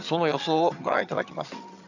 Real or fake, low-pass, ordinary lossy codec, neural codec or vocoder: fake; 7.2 kHz; none; vocoder, 22.05 kHz, 80 mel bands, HiFi-GAN